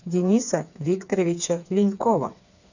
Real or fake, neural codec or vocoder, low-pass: fake; codec, 16 kHz, 4 kbps, FreqCodec, smaller model; 7.2 kHz